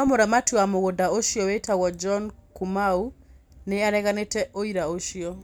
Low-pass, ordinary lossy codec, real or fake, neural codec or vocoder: none; none; real; none